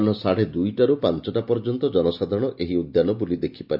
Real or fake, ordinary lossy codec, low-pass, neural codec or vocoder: real; none; 5.4 kHz; none